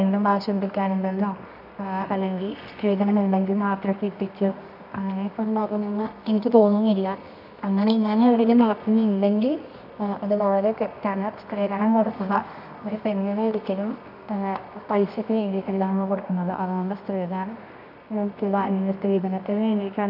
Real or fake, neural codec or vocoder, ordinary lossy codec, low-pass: fake; codec, 24 kHz, 0.9 kbps, WavTokenizer, medium music audio release; none; 5.4 kHz